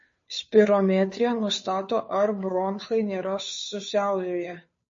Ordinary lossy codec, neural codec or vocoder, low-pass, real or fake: MP3, 32 kbps; codec, 16 kHz, 2 kbps, FunCodec, trained on Chinese and English, 25 frames a second; 7.2 kHz; fake